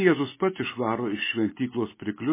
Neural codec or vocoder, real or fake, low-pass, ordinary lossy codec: none; real; 3.6 kHz; MP3, 16 kbps